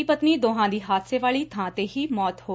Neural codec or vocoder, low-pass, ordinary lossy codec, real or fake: none; none; none; real